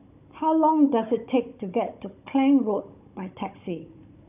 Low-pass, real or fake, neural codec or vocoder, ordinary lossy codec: 3.6 kHz; fake; codec, 16 kHz, 16 kbps, FunCodec, trained on Chinese and English, 50 frames a second; none